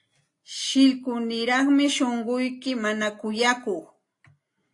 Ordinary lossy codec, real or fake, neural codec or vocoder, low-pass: AAC, 48 kbps; real; none; 10.8 kHz